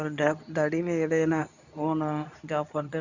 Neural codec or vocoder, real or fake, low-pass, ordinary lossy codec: codec, 24 kHz, 0.9 kbps, WavTokenizer, medium speech release version 2; fake; 7.2 kHz; none